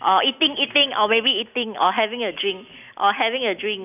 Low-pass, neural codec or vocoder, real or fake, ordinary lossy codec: 3.6 kHz; none; real; none